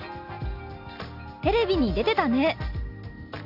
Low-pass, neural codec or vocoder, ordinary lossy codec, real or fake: 5.4 kHz; none; AAC, 48 kbps; real